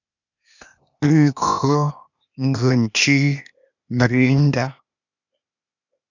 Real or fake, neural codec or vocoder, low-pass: fake; codec, 16 kHz, 0.8 kbps, ZipCodec; 7.2 kHz